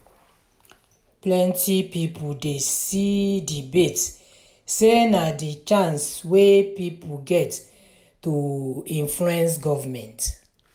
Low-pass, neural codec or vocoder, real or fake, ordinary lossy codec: none; none; real; none